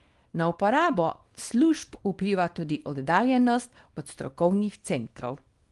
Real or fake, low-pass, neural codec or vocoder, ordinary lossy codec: fake; 10.8 kHz; codec, 24 kHz, 0.9 kbps, WavTokenizer, small release; Opus, 24 kbps